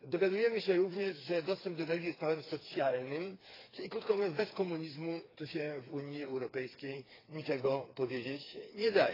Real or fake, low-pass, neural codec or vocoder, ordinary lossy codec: fake; 5.4 kHz; codec, 16 kHz, 4 kbps, FreqCodec, smaller model; AAC, 24 kbps